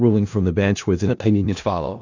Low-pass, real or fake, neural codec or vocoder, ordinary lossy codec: 7.2 kHz; fake; codec, 16 kHz in and 24 kHz out, 0.4 kbps, LongCat-Audio-Codec, four codebook decoder; AAC, 48 kbps